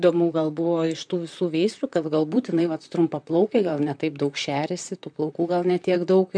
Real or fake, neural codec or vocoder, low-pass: fake; vocoder, 44.1 kHz, 128 mel bands, Pupu-Vocoder; 9.9 kHz